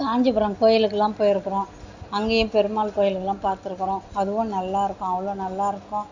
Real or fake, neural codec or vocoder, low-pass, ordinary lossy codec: real; none; 7.2 kHz; Opus, 64 kbps